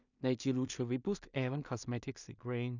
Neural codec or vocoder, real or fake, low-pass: codec, 16 kHz in and 24 kHz out, 0.4 kbps, LongCat-Audio-Codec, two codebook decoder; fake; 7.2 kHz